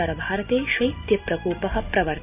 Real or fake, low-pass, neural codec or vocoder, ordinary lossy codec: real; 3.6 kHz; none; none